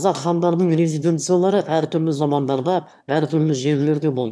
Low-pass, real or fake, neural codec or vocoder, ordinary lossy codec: none; fake; autoencoder, 22.05 kHz, a latent of 192 numbers a frame, VITS, trained on one speaker; none